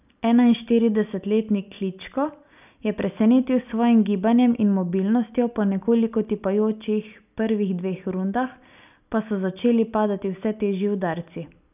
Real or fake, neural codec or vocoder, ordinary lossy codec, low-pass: real; none; none; 3.6 kHz